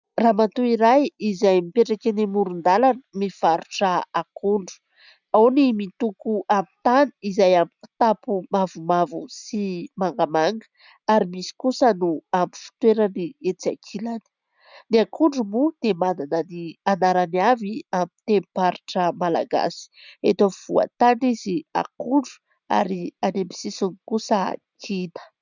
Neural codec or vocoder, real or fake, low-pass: none; real; 7.2 kHz